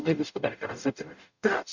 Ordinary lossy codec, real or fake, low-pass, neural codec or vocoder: none; fake; 7.2 kHz; codec, 44.1 kHz, 0.9 kbps, DAC